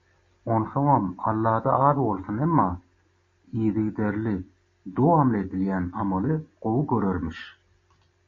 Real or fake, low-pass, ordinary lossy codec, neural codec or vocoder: real; 7.2 kHz; MP3, 32 kbps; none